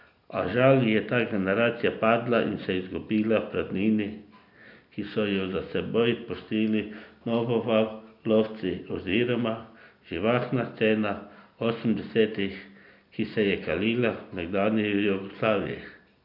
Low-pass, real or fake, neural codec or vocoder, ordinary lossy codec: 5.4 kHz; real; none; none